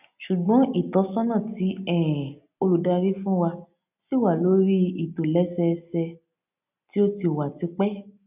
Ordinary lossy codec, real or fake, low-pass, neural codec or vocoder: none; real; 3.6 kHz; none